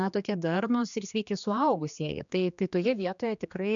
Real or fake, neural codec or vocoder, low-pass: fake; codec, 16 kHz, 2 kbps, X-Codec, HuBERT features, trained on general audio; 7.2 kHz